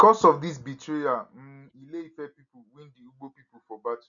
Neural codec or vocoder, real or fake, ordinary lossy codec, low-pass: none; real; none; 7.2 kHz